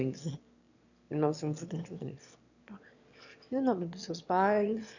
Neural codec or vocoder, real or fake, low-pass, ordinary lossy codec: autoencoder, 22.05 kHz, a latent of 192 numbers a frame, VITS, trained on one speaker; fake; 7.2 kHz; none